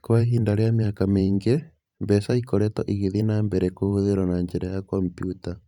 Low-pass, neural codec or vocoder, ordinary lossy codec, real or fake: 19.8 kHz; vocoder, 44.1 kHz, 128 mel bands every 512 samples, BigVGAN v2; none; fake